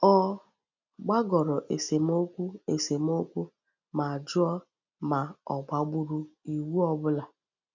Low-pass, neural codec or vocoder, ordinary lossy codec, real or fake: 7.2 kHz; none; none; real